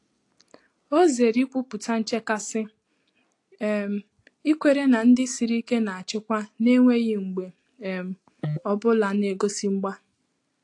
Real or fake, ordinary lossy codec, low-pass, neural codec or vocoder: real; AAC, 48 kbps; 10.8 kHz; none